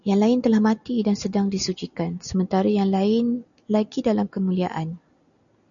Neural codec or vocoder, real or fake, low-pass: none; real; 7.2 kHz